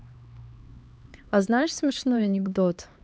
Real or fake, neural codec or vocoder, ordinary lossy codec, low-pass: fake; codec, 16 kHz, 2 kbps, X-Codec, HuBERT features, trained on LibriSpeech; none; none